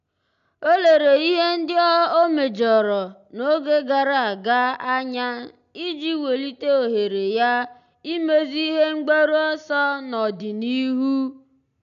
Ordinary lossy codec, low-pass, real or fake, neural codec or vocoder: none; 7.2 kHz; real; none